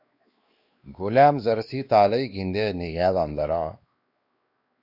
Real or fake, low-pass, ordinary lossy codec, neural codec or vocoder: fake; 5.4 kHz; Opus, 64 kbps; codec, 16 kHz, 2 kbps, X-Codec, WavLM features, trained on Multilingual LibriSpeech